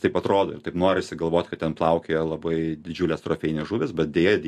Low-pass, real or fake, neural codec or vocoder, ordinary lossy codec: 14.4 kHz; real; none; MP3, 64 kbps